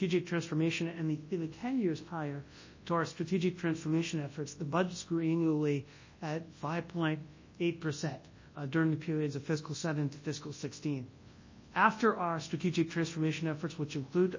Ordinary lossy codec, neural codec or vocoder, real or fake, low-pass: MP3, 32 kbps; codec, 24 kHz, 0.9 kbps, WavTokenizer, large speech release; fake; 7.2 kHz